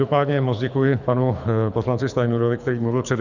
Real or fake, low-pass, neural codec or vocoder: fake; 7.2 kHz; codec, 24 kHz, 6 kbps, HILCodec